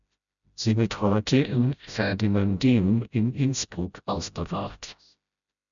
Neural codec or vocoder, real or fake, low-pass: codec, 16 kHz, 0.5 kbps, FreqCodec, smaller model; fake; 7.2 kHz